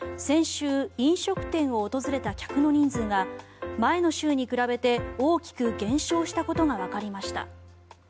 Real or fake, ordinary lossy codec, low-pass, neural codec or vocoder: real; none; none; none